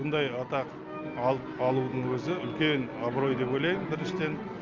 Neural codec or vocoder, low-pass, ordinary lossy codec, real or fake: none; 7.2 kHz; Opus, 24 kbps; real